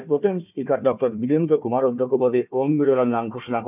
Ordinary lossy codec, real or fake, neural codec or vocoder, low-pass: none; fake; codec, 16 kHz, 1 kbps, FunCodec, trained on Chinese and English, 50 frames a second; 3.6 kHz